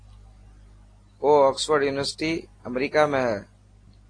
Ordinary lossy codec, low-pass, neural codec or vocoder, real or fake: AAC, 32 kbps; 9.9 kHz; none; real